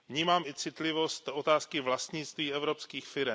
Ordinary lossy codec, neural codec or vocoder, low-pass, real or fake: none; none; none; real